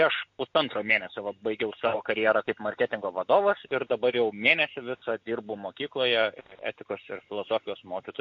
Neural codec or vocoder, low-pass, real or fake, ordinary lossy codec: none; 7.2 kHz; real; MP3, 48 kbps